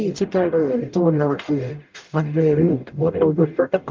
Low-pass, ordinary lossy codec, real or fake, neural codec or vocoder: 7.2 kHz; Opus, 32 kbps; fake; codec, 44.1 kHz, 0.9 kbps, DAC